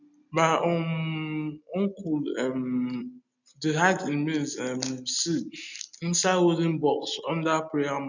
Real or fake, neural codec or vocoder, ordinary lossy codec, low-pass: real; none; none; 7.2 kHz